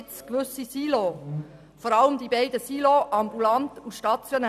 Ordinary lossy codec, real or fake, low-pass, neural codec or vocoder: none; real; 14.4 kHz; none